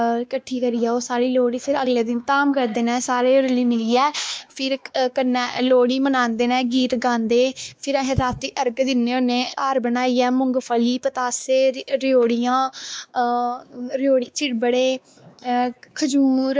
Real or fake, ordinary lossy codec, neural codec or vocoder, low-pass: fake; none; codec, 16 kHz, 2 kbps, X-Codec, WavLM features, trained on Multilingual LibriSpeech; none